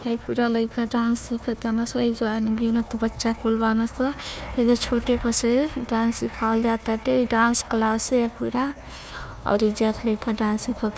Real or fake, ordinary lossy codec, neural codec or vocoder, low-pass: fake; none; codec, 16 kHz, 1 kbps, FunCodec, trained on Chinese and English, 50 frames a second; none